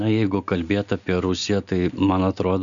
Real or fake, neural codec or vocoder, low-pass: real; none; 7.2 kHz